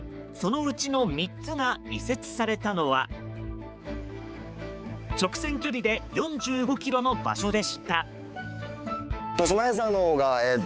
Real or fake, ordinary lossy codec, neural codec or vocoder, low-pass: fake; none; codec, 16 kHz, 4 kbps, X-Codec, HuBERT features, trained on balanced general audio; none